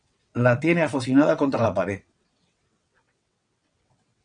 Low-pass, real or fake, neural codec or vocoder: 9.9 kHz; fake; vocoder, 22.05 kHz, 80 mel bands, WaveNeXt